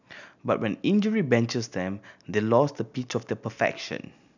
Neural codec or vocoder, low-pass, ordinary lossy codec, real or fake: none; 7.2 kHz; none; real